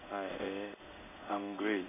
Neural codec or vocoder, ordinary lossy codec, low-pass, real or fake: codec, 16 kHz in and 24 kHz out, 1 kbps, XY-Tokenizer; none; 3.6 kHz; fake